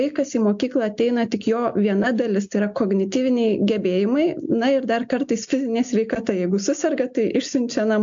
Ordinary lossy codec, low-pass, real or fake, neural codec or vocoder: AAC, 64 kbps; 7.2 kHz; real; none